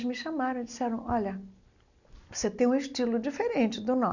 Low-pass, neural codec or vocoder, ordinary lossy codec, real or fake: 7.2 kHz; none; none; real